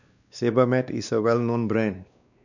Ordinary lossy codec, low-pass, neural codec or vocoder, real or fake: none; 7.2 kHz; codec, 16 kHz, 2 kbps, X-Codec, WavLM features, trained on Multilingual LibriSpeech; fake